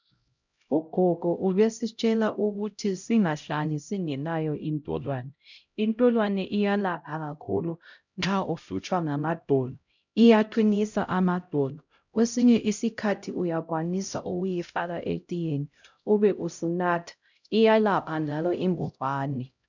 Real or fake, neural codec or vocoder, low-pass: fake; codec, 16 kHz, 0.5 kbps, X-Codec, HuBERT features, trained on LibriSpeech; 7.2 kHz